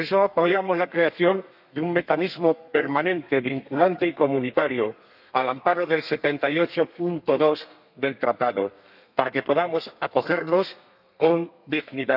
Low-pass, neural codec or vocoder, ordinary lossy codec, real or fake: 5.4 kHz; codec, 32 kHz, 1.9 kbps, SNAC; none; fake